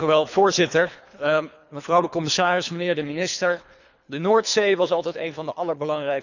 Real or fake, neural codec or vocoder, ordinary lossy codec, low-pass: fake; codec, 24 kHz, 3 kbps, HILCodec; none; 7.2 kHz